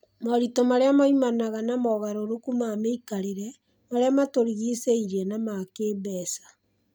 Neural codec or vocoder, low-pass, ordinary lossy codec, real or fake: none; none; none; real